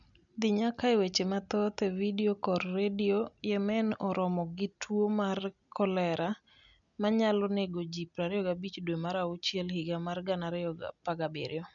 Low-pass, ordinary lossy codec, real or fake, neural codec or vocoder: 7.2 kHz; none; real; none